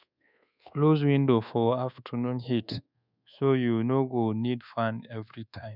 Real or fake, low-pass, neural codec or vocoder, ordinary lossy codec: fake; 5.4 kHz; codec, 24 kHz, 1.2 kbps, DualCodec; none